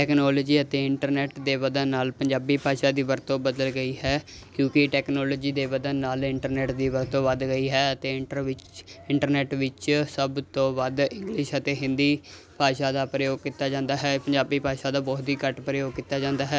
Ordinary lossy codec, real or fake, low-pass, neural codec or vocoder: none; real; none; none